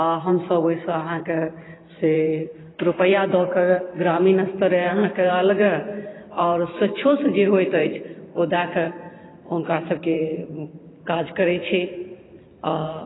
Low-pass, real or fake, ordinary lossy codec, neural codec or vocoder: 7.2 kHz; fake; AAC, 16 kbps; vocoder, 44.1 kHz, 128 mel bands every 512 samples, BigVGAN v2